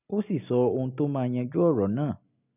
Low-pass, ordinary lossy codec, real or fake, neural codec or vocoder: 3.6 kHz; none; real; none